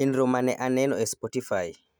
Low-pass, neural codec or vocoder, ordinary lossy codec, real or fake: none; none; none; real